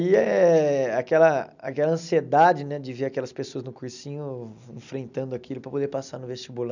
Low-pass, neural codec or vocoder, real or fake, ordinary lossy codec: 7.2 kHz; none; real; none